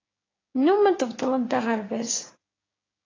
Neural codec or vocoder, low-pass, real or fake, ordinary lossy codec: codec, 16 kHz, 6 kbps, DAC; 7.2 kHz; fake; AAC, 32 kbps